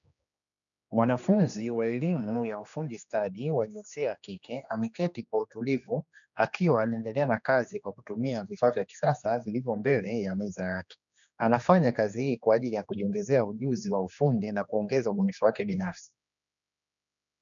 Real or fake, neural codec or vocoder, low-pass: fake; codec, 16 kHz, 2 kbps, X-Codec, HuBERT features, trained on general audio; 7.2 kHz